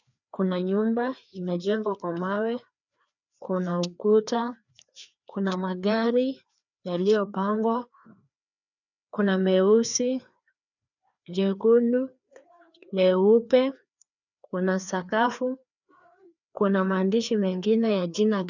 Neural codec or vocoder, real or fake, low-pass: codec, 16 kHz, 2 kbps, FreqCodec, larger model; fake; 7.2 kHz